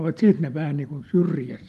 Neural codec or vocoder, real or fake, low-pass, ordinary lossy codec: vocoder, 44.1 kHz, 128 mel bands every 256 samples, BigVGAN v2; fake; 14.4 kHz; Opus, 32 kbps